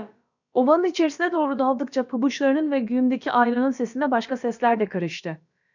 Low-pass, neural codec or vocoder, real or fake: 7.2 kHz; codec, 16 kHz, about 1 kbps, DyCAST, with the encoder's durations; fake